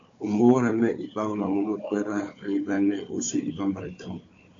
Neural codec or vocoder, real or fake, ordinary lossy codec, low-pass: codec, 16 kHz, 4 kbps, FunCodec, trained on Chinese and English, 50 frames a second; fake; AAC, 48 kbps; 7.2 kHz